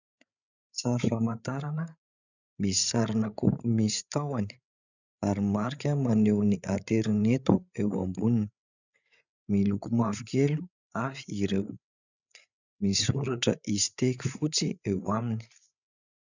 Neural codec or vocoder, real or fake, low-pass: codec, 16 kHz, 8 kbps, FreqCodec, larger model; fake; 7.2 kHz